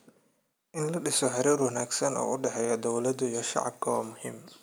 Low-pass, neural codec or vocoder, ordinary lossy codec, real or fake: none; none; none; real